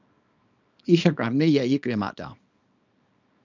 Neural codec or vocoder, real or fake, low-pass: codec, 24 kHz, 0.9 kbps, WavTokenizer, small release; fake; 7.2 kHz